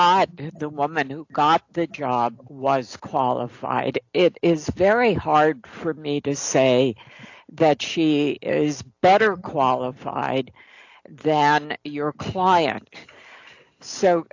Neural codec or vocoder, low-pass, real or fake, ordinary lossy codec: none; 7.2 kHz; real; AAC, 48 kbps